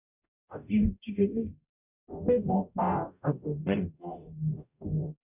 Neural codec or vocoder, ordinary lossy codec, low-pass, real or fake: codec, 44.1 kHz, 0.9 kbps, DAC; none; 3.6 kHz; fake